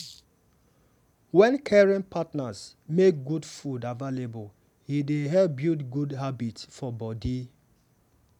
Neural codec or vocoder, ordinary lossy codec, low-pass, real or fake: none; none; 19.8 kHz; real